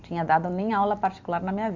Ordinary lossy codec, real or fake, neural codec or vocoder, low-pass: Opus, 64 kbps; real; none; 7.2 kHz